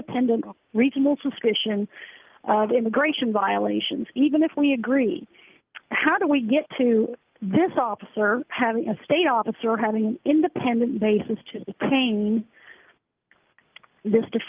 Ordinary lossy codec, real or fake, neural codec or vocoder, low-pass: Opus, 32 kbps; real; none; 3.6 kHz